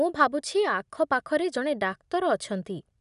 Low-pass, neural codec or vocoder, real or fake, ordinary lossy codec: 10.8 kHz; none; real; none